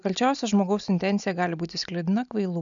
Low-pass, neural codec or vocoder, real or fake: 7.2 kHz; none; real